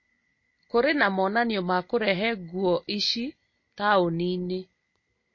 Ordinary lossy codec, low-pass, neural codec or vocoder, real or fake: MP3, 32 kbps; 7.2 kHz; none; real